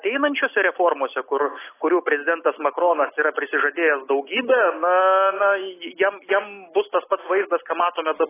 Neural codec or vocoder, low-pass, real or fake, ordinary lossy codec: none; 3.6 kHz; real; AAC, 16 kbps